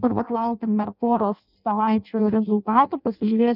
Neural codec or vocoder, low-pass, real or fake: codec, 16 kHz in and 24 kHz out, 0.6 kbps, FireRedTTS-2 codec; 5.4 kHz; fake